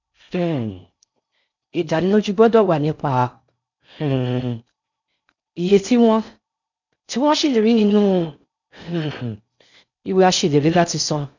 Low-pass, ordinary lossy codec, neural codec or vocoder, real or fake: 7.2 kHz; none; codec, 16 kHz in and 24 kHz out, 0.6 kbps, FocalCodec, streaming, 4096 codes; fake